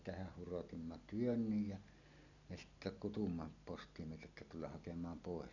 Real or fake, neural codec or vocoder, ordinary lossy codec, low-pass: real; none; none; 7.2 kHz